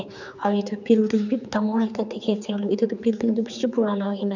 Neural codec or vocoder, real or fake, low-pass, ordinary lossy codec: codec, 16 kHz, 4 kbps, X-Codec, HuBERT features, trained on general audio; fake; 7.2 kHz; none